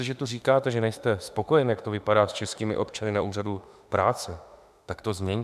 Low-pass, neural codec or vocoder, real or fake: 14.4 kHz; autoencoder, 48 kHz, 32 numbers a frame, DAC-VAE, trained on Japanese speech; fake